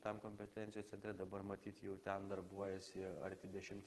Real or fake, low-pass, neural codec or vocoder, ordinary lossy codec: fake; 14.4 kHz; vocoder, 44.1 kHz, 128 mel bands every 512 samples, BigVGAN v2; Opus, 16 kbps